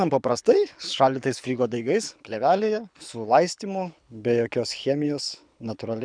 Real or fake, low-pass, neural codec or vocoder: fake; 9.9 kHz; codec, 24 kHz, 6 kbps, HILCodec